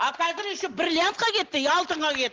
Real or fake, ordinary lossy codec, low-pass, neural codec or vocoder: real; Opus, 16 kbps; 7.2 kHz; none